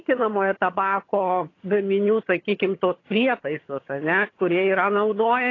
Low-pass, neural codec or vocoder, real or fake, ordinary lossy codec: 7.2 kHz; vocoder, 22.05 kHz, 80 mel bands, HiFi-GAN; fake; AAC, 32 kbps